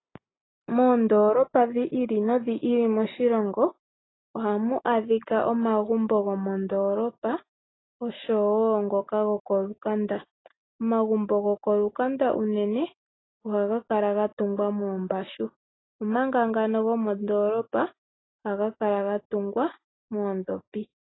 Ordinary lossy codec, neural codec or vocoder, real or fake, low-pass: AAC, 16 kbps; none; real; 7.2 kHz